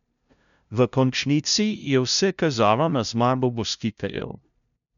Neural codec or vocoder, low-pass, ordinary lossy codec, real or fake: codec, 16 kHz, 0.5 kbps, FunCodec, trained on LibriTTS, 25 frames a second; 7.2 kHz; none; fake